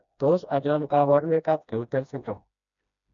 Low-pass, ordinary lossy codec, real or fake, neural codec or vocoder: 7.2 kHz; none; fake; codec, 16 kHz, 1 kbps, FreqCodec, smaller model